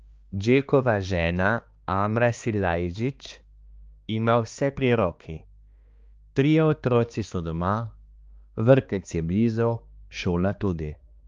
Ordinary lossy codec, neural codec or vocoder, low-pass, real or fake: Opus, 32 kbps; codec, 16 kHz, 2 kbps, X-Codec, HuBERT features, trained on balanced general audio; 7.2 kHz; fake